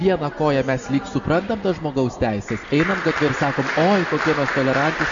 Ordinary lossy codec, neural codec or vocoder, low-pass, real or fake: MP3, 64 kbps; none; 7.2 kHz; real